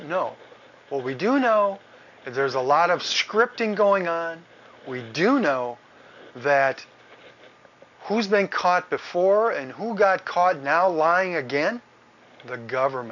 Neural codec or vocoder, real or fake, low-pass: none; real; 7.2 kHz